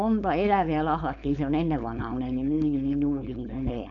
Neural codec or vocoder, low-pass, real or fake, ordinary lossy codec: codec, 16 kHz, 4.8 kbps, FACodec; 7.2 kHz; fake; none